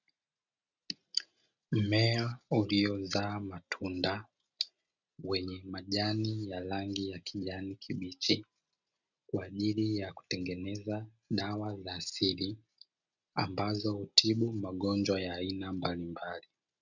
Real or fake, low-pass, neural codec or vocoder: real; 7.2 kHz; none